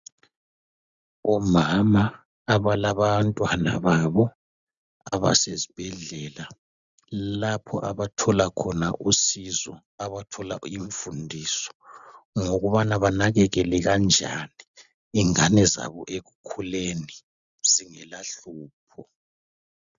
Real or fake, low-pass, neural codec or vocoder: real; 7.2 kHz; none